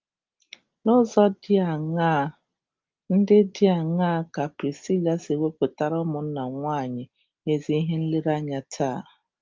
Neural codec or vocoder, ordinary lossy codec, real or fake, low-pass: none; Opus, 32 kbps; real; 7.2 kHz